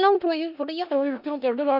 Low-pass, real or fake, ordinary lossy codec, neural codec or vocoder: 5.4 kHz; fake; none; codec, 16 kHz in and 24 kHz out, 0.4 kbps, LongCat-Audio-Codec, four codebook decoder